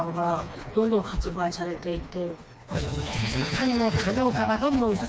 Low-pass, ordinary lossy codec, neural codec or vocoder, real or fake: none; none; codec, 16 kHz, 2 kbps, FreqCodec, smaller model; fake